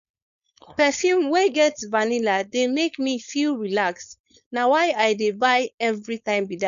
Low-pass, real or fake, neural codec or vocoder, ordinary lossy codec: 7.2 kHz; fake; codec, 16 kHz, 4.8 kbps, FACodec; none